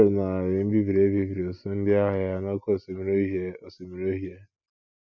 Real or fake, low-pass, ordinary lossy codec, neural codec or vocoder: real; 7.2 kHz; none; none